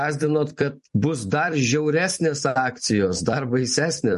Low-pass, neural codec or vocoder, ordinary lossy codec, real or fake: 14.4 kHz; vocoder, 44.1 kHz, 128 mel bands every 256 samples, BigVGAN v2; MP3, 48 kbps; fake